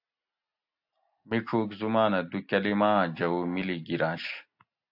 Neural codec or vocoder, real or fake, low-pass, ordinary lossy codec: none; real; 5.4 kHz; AAC, 48 kbps